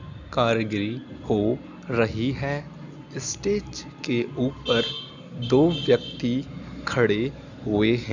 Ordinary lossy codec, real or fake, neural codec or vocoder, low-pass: none; real; none; 7.2 kHz